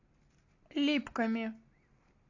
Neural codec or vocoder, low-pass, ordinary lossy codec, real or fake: none; 7.2 kHz; AAC, 48 kbps; real